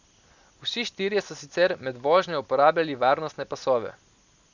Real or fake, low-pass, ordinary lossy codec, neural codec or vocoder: real; 7.2 kHz; none; none